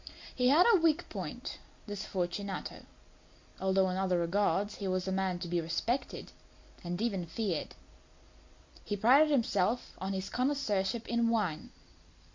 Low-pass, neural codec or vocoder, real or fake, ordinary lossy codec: 7.2 kHz; none; real; MP3, 48 kbps